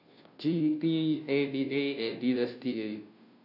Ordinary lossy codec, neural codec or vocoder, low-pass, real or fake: none; codec, 16 kHz, 0.5 kbps, FunCodec, trained on Chinese and English, 25 frames a second; 5.4 kHz; fake